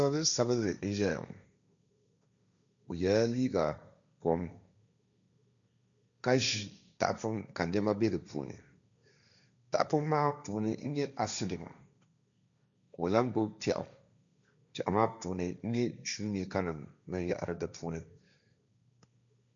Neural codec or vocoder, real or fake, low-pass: codec, 16 kHz, 1.1 kbps, Voila-Tokenizer; fake; 7.2 kHz